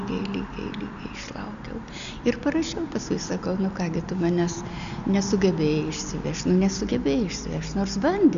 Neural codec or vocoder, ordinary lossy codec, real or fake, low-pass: none; AAC, 96 kbps; real; 7.2 kHz